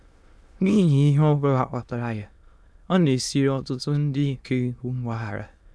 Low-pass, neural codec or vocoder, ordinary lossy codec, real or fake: none; autoencoder, 22.05 kHz, a latent of 192 numbers a frame, VITS, trained on many speakers; none; fake